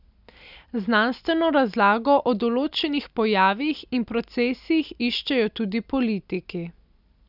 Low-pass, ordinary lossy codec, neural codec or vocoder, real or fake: 5.4 kHz; none; none; real